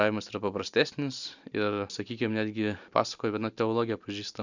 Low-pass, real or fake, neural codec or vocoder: 7.2 kHz; real; none